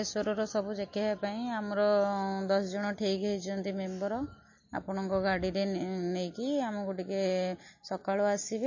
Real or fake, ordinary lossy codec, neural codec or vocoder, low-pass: real; MP3, 32 kbps; none; 7.2 kHz